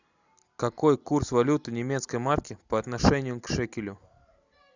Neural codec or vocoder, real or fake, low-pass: none; real; 7.2 kHz